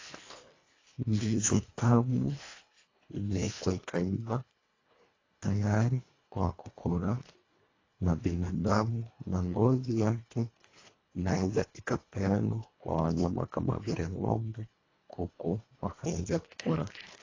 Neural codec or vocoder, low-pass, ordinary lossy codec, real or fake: codec, 24 kHz, 1.5 kbps, HILCodec; 7.2 kHz; AAC, 32 kbps; fake